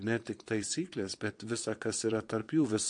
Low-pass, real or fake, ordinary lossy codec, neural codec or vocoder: 9.9 kHz; fake; MP3, 48 kbps; vocoder, 22.05 kHz, 80 mel bands, Vocos